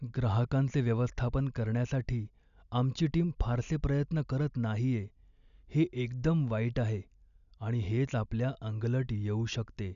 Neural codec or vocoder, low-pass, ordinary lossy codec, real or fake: none; 7.2 kHz; none; real